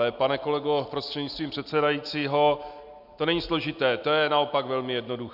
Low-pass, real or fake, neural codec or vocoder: 5.4 kHz; real; none